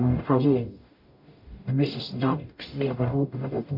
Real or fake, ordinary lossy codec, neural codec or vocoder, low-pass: fake; MP3, 48 kbps; codec, 44.1 kHz, 0.9 kbps, DAC; 5.4 kHz